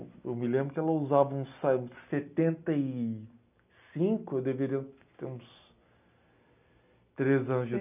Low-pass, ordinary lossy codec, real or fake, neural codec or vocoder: 3.6 kHz; none; real; none